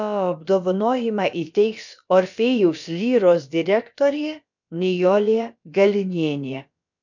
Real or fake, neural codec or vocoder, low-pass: fake; codec, 16 kHz, about 1 kbps, DyCAST, with the encoder's durations; 7.2 kHz